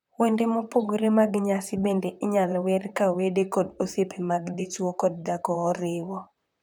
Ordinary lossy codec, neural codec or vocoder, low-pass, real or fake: none; vocoder, 44.1 kHz, 128 mel bands, Pupu-Vocoder; 19.8 kHz; fake